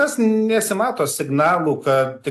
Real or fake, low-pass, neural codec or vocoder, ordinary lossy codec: real; 14.4 kHz; none; AAC, 64 kbps